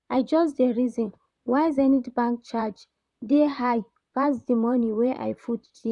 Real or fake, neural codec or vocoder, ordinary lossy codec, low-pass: fake; vocoder, 44.1 kHz, 128 mel bands, Pupu-Vocoder; none; 10.8 kHz